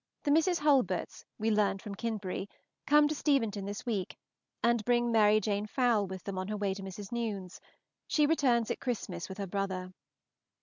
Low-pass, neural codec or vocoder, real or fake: 7.2 kHz; none; real